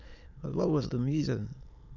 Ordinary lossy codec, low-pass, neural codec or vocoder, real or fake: none; 7.2 kHz; autoencoder, 22.05 kHz, a latent of 192 numbers a frame, VITS, trained on many speakers; fake